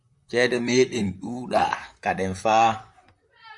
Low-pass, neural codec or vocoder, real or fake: 10.8 kHz; vocoder, 44.1 kHz, 128 mel bands, Pupu-Vocoder; fake